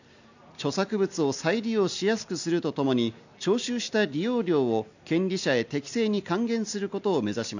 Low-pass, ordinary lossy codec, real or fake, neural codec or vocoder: 7.2 kHz; none; real; none